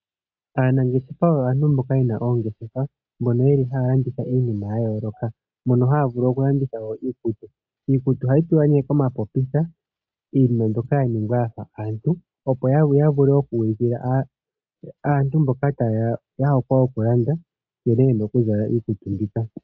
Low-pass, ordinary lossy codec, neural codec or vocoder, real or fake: 7.2 kHz; AAC, 48 kbps; none; real